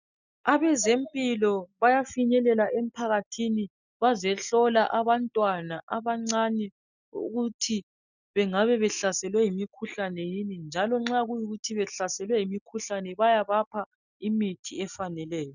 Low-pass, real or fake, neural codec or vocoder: 7.2 kHz; real; none